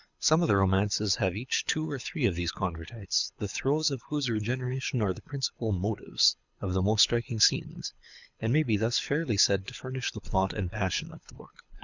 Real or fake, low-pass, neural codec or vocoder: fake; 7.2 kHz; codec, 24 kHz, 6 kbps, HILCodec